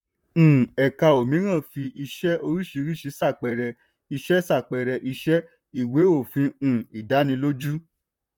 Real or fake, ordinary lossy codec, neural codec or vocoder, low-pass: fake; none; vocoder, 44.1 kHz, 128 mel bands, Pupu-Vocoder; 19.8 kHz